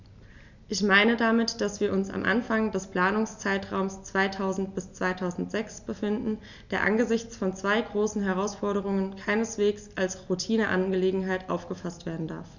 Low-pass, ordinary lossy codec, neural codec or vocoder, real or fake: 7.2 kHz; none; none; real